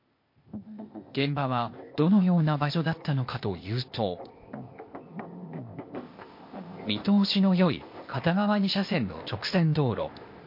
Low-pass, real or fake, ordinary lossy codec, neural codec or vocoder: 5.4 kHz; fake; MP3, 32 kbps; codec, 16 kHz, 0.8 kbps, ZipCodec